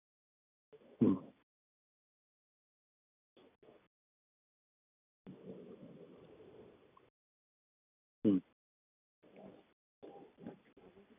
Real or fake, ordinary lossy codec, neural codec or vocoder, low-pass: real; none; none; 3.6 kHz